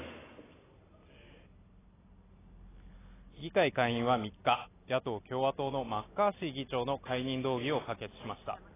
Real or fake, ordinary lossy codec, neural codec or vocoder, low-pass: real; AAC, 16 kbps; none; 3.6 kHz